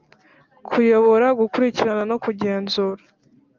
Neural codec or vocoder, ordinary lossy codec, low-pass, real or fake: none; Opus, 32 kbps; 7.2 kHz; real